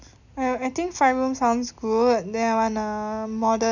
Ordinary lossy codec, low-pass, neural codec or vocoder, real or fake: none; 7.2 kHz; none; real